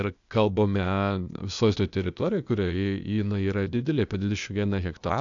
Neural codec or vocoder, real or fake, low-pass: codec, 16 kHz, 0.8 kbps, ZipCodec; fake; 7.2 kHz